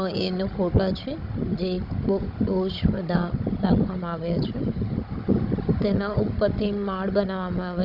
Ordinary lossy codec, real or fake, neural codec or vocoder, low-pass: Opus, 64 kbps; fake; codec, 16 kHz, 16 kbps, FunCodec, trained on Chinese and English, 50 frames a second; 5.4 kHz